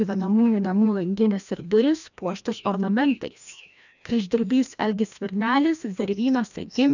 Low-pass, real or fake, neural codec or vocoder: 7.2 kHz; fake; codec, 16 kHz, 1 kbps, FreqCodec, larger model